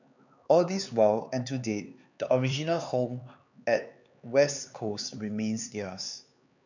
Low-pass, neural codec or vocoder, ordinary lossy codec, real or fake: 7.2 kHz; codec, 16 kHz, 4 kbps, X-Codec, HuBERT features, trained on LibriSpeech; none; fake